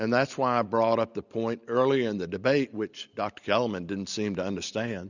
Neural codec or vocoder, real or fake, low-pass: none; real; 7.2 kHz